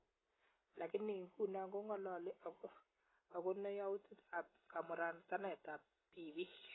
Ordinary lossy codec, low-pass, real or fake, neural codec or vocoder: AAC, 16 kbps; 7.2 kHz; real; none